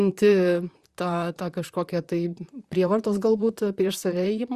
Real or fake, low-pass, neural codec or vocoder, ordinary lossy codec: fake; 14.4 kHz; vocoder, 44.1 kHz, 128 mel bands, Pupu-Vocoder; Opus, 64 kbps